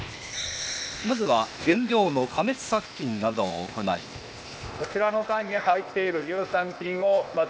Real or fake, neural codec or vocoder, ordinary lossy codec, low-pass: fake; codec, 16 kHz, 0.8 kbps, ZipCodec; none; none